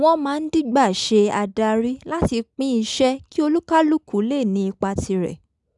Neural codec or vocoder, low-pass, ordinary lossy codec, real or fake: none; 10.8 kHz; none; real